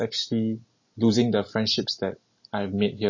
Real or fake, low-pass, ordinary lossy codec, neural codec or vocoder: real; 7.2 kHz; MP3, 32 kbps; none